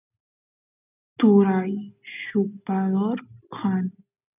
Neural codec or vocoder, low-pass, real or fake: none; 3.6 kHz; real